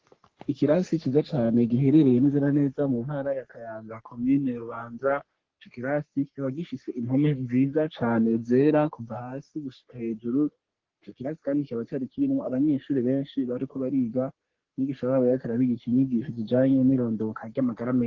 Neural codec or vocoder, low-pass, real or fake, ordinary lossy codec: codec, 44.1 kHz, 3.4 kbps, Pupu-Codec; 7.2 kHz; fake; Opus, 16 kbps